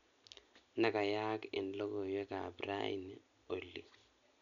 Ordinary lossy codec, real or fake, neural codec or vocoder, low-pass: none; real; none; 7.2 kHz